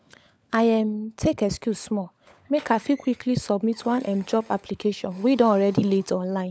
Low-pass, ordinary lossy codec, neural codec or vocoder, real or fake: none; none; codec, 16 kHz, 16 kbps, FunCodec, trained on LibriTTS, 50 frames a second; fake